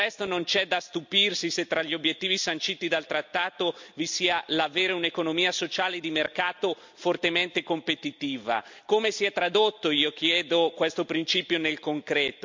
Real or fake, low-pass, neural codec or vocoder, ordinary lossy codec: real; 7.2 kHz; none; MP3, 64 kbps